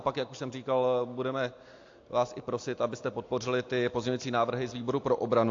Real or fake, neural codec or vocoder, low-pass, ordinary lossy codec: real; none; 7.2 kHz; AAC, 48 kbps